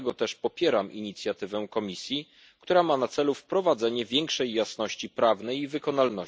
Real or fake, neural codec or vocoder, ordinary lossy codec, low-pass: real; none; none; none